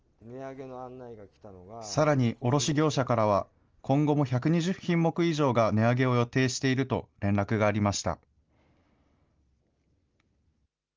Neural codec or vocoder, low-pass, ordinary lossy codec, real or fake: none; 7.2 kHz; Opus, 24 kbps; real